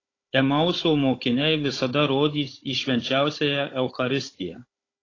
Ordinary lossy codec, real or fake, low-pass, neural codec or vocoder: AAC, 32 kbps; fake; 7.2 kHz; codec, 16 kHz, 16 kbps, FunCodec, trained on Chinese and English, 50 frames a second